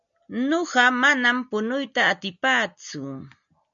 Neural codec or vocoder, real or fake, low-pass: none; real; 7.2 kHz